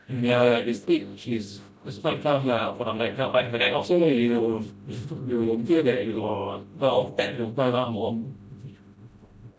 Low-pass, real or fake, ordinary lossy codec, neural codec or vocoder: none; fake; none; codec, 16 kHz, 0.5 kbps, FreqCodec, smaller model